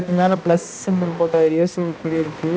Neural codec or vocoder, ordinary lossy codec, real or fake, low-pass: codec, 16 kHz, 1 kbps, X-Codec, HuBERT features, trained on balanced general audio; none; fake; none